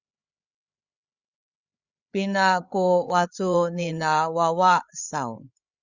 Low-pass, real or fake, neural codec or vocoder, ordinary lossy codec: 7.2 kHz; fake; codec, 16 kHz, 8 kbps, FreqCodec, larger model; Opus, 64 kbps